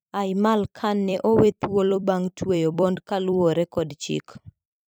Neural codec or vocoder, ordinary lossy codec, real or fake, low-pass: vocoder, 44.1 kHz, 128 mel bands every 256 samples, BigVGAN v2; none; fake; none